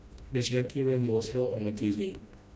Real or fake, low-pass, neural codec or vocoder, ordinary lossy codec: fake; none; codec, 16 kHz, 1 kbps, FreqCodec, smaller model; none